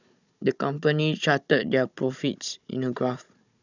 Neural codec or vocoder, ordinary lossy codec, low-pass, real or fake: codec, 16 kHz, 16 kbps, FunCodec, trained on Chinese and English, 50 frames a second; none; 7.2 kHz; fake